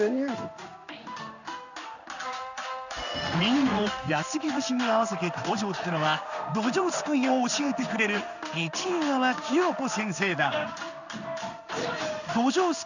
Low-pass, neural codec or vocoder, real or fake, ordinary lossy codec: 7.2 kHz; codec, 16 kHz in and 24 kHz out, 1 kbps, XY-Tokenizer; fake; none